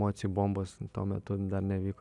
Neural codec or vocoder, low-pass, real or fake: none; 10.8 kHz; real